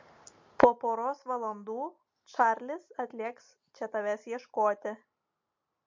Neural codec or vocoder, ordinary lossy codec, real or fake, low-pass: none; MP3, 48 kbps; real; 7.2 kHz